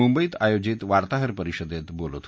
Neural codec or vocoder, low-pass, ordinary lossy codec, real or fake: none; none; none; real